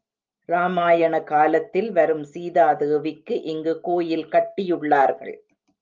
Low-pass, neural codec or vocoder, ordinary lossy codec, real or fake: 7.2 kHz; none; Opus, 24 kbps; real